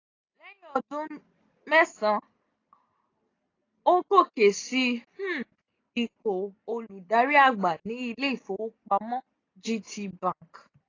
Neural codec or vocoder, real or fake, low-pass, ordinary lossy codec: none; real; 7.2 kHz; AAC, 32 kbps